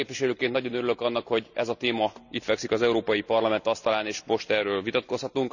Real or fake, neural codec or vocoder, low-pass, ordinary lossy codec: real; none; 7.2 kHz; none